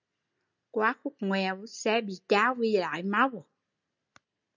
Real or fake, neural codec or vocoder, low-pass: real; none; 7.2 kHz